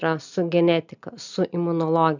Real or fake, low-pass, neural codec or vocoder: real; 7.2 kHz; none